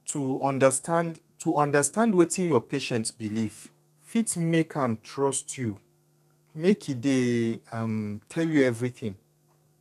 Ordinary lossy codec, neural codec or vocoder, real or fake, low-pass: none; codec, 32 kHz, 1.9 kbps, SNAC; fake; 14.4 kHz